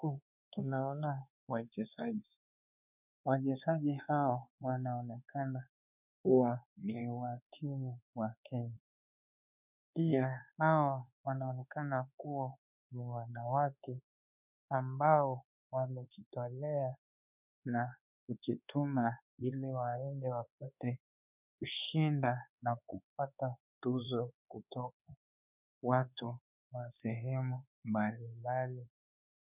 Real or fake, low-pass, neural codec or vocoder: fake; 3.6 kHz; codec, 24 kHz, 1.2 kbps, DualCodec